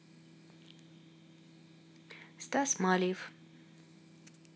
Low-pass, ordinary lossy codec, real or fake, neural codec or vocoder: none; none; real; none